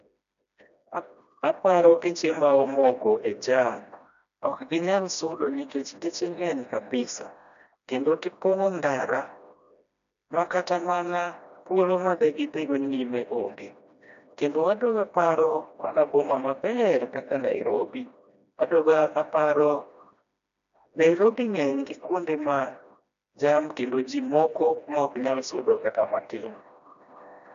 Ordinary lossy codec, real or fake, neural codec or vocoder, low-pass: none; fake; codec, 16 kHz, 1 kbps, FreqCodec, smaller model; 7.2 kHz